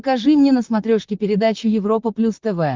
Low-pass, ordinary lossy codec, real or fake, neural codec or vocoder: 7.2 kHz; Opus, 24 kbps; fake; vocoder, 44.1 kHz, 128 mel bands, Pupu-Vocoder